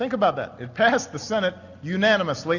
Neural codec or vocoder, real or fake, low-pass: none; real; 7.2 kHz